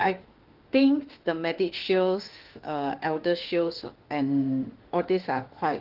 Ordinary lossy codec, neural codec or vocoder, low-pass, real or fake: Opus, 16 kbps; autoencoder, 48 kHz, 32 numbers a frame, DAC-VAE, trained on Japanese speech; 5.4 kHz; fake